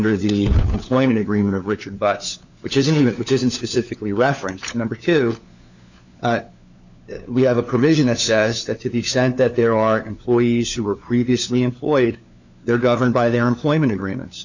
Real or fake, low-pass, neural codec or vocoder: fake; 7.2 kHz; codec, 16 kHz, 4 kbps, FunCodec, trained on LibriTTS, 50 frames a second